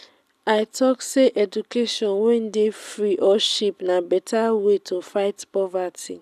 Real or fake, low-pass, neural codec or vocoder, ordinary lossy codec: real; 14.4 kHz; none; none